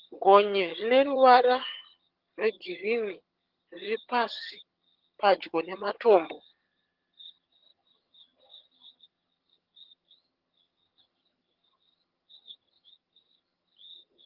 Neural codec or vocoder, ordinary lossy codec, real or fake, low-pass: vocoder, 22.05 kHz, 80 mel bands, HiFi-GAN; Opus, 16 kbps; fake; 5.4 kHz